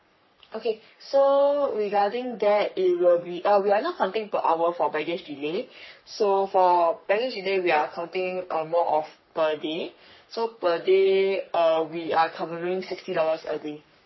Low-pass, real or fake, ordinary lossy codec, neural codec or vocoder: 7.2 kHz; fake; MP3, 24 kbps; codec, 44.1 kHz, 3.4 kbps, Pupu-Codec